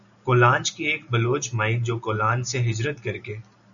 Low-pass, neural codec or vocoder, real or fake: 7.2 kHz; none; real